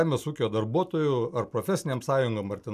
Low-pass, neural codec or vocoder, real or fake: 14.4 kHz; none; real